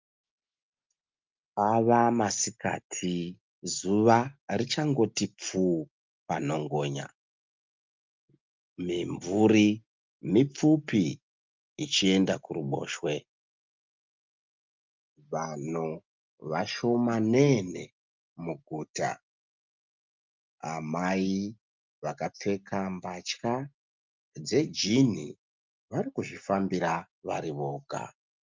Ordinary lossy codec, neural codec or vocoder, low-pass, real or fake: Opus, 24 kbps; none; 7.2 kHz; real